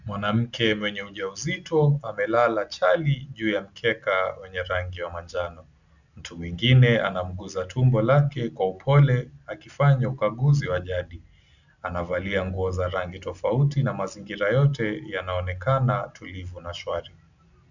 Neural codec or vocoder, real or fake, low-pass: none; real; 7.2 kHz